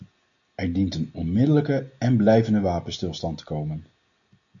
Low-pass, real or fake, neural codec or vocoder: 7.2 kHz; real; none